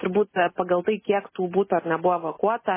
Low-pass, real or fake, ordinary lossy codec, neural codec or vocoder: 3.6 kHz; real; MP3, 16 kbps; none